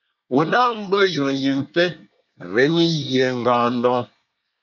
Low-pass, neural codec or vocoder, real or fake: 7.2 kHz; codec, 24 kHz, 1 kbps, SNAC; fake